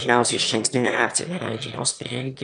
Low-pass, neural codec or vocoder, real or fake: 9.9 kHz; autoencoder, 22.05 kHz, a latent of 192 numbers a frame, VITS, trained on one speaker; fake